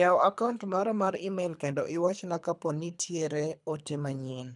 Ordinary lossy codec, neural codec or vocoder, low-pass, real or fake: none; codec, 24 kHz, 3 kbps, HILCodec; 10.8 kHz; fake